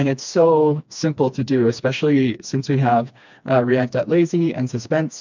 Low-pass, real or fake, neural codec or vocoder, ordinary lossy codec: 7.2 kHz; fake; codec, 16 kHz, 2 kbps, FreqCodec, smaller model; MP3, 64 kbps